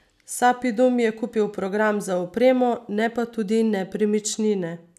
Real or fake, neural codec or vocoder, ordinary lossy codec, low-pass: real; none; none; 14.4 kHz